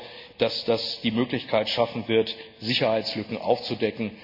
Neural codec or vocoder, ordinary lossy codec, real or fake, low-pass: none; MP3, 48 kbps; real; 5.4 kHz